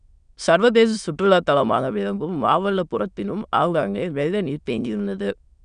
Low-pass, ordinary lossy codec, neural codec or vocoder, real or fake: 9.9 kHz; none; autoencoder, 22.05 kHz, a latent of 192 numbers a frame, VITS, trained on many speakers; fake